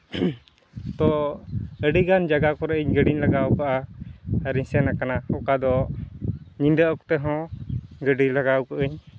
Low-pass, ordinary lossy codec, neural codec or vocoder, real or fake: none; none; none; real